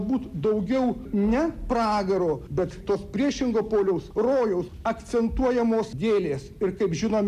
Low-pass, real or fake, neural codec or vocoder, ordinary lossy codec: 14.4 kHz; real; none; MP3, 96 kbps